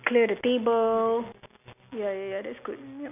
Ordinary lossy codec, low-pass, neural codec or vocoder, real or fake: none; 3.6 kHz; none; real